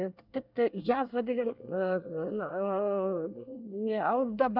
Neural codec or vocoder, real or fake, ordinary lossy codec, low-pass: codec, 24 kHz, 1 kbps, SNAC; fake; none; 5.4 kHz